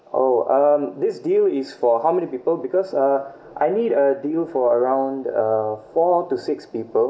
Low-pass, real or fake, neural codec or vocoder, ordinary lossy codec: none; real; none; none